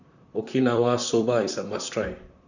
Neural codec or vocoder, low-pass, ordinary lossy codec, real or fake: vocoder, 44.1 kHz, 128 mel bands, Pupu-Vocoder; 7.2 kHz; none; fake